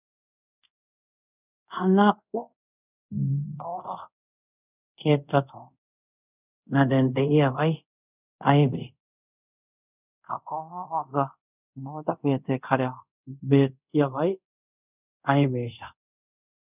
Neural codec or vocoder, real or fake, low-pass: codec, 24 kHz, 0.5 kbps, DualCodec; fake; 3.6 kHz